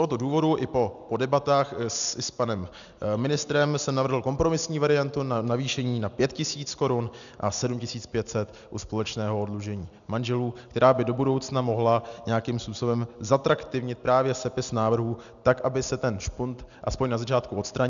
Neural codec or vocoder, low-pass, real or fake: none; 7.2 kHz; real